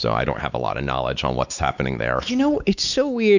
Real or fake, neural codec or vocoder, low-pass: fake; codec, 16 kHz, 4 kbps, X-Codec, WavLM features, trained on Multilingual LibriSpeech; 7.2 kHz